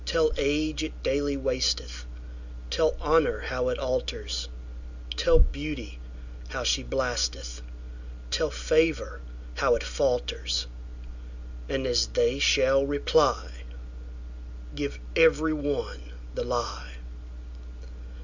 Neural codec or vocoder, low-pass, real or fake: none; 7.2 kHz; real